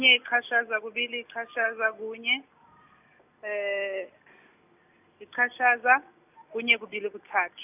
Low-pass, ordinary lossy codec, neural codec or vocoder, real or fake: 3.6 kHz; none; none; real